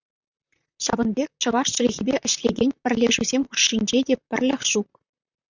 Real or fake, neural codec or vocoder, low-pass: fake; vocoder, 44.1 kHz, 128 mel bands, Pupu-Vocoder; 7.2 kHz